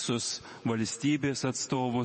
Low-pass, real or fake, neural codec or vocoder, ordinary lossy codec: 10.8 kHz; fake; vocoder, 48 kHz, 128 mel bands, Vocos; MP3, 32 kbps